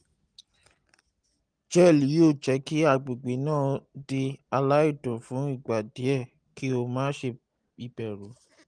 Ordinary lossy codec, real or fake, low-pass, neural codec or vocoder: Opus, 24 kbps; real; 9.9 kHz; none